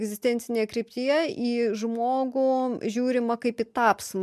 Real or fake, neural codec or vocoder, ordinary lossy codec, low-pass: real; none; MP3, 96 kbps; 14.4 kHz